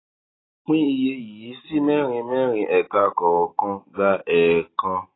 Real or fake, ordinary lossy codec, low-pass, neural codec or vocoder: real; AAC, 16 kbps; 7.2 kHz; none